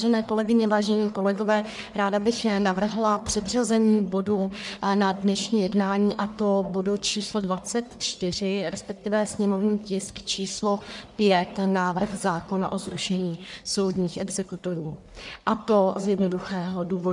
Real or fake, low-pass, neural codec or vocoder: fake; 10.8 kHz; codec, 44.1 kHz, 1.7 kbps, Pupu-Codec